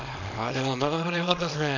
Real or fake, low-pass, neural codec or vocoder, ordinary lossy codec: fake; 7.2 kHz; codec, 24 kHz, 0.9 kbps, WavTokenizer, small release; none